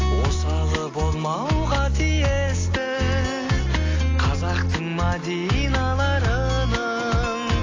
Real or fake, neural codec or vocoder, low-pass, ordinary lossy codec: real; none; 7.2 kHz; AAC, 32 kbps